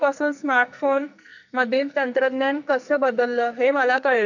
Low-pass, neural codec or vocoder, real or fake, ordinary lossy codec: 7.2 kHz; codec, 44.1 kHz, 2.6 kbps, SNAC; fake; none